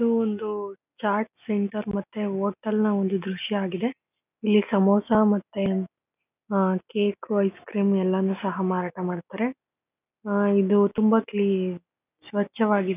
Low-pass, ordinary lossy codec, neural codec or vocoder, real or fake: 3.6 kHz; none; none; real